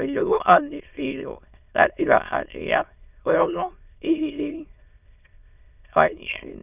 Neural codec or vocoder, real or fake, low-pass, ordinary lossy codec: autoencoder, 22.05 kHz, a latent of 192 numbers a frame, VITS, trained on many speakers; fake; 3.6 kHz; none